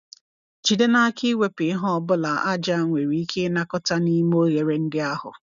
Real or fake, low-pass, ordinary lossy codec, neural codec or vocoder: real; 7.2 kHz; MP3, 96 kbps; none